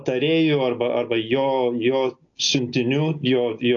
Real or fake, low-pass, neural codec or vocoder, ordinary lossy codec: real; 7.2 kHz; none; AAC, 48 kbps